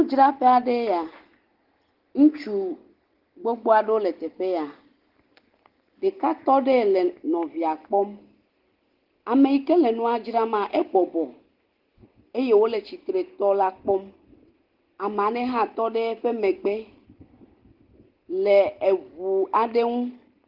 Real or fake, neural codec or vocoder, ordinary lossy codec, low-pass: real; none; Opus, 16 kbps; 5.4 kHz